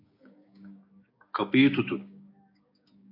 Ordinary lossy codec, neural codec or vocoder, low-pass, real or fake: MP3, 32 kbps; codec, 16 kHz, 6 kbps, DAC; 5.4 kHz; fake